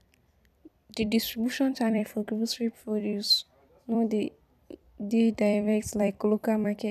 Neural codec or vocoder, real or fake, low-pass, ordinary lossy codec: vocoder, 44.1 kHz, 128 mel bands every 256 samples, BigVGAN v2; fake; 14.4 kHz; none